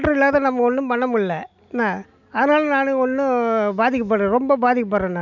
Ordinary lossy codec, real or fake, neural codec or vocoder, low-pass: none; real; none; 7.2 kHz